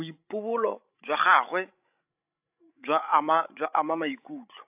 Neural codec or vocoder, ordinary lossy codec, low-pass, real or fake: codec, 16 kHz, 16 kbps, FreqCodec, larger model; AAC, 32 kbps; 3.6 kHz; fake